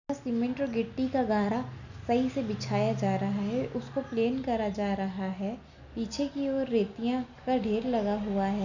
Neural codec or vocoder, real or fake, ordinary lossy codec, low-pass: none; real; none; 7.2 kHz